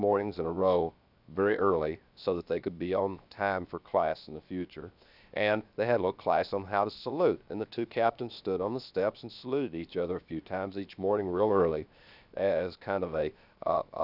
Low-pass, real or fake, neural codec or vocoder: 5.4 kHz; fake; codec, 16 kHz, 0.7 kbps, FocalCodec